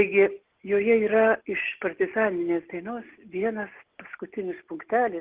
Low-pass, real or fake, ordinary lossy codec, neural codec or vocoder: 3.6 kHz; real; Opus, 16 kbps; none